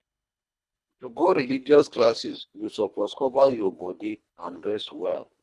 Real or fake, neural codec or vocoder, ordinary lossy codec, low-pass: fake; codec, 24 kHz, 1.5 kbps, HILCodec; none; none